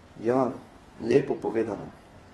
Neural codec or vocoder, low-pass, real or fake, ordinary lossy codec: codec, 32 kHz, 1.9 kbps, SNAC; 14.4 kHz; fake; AAC, 32 kbps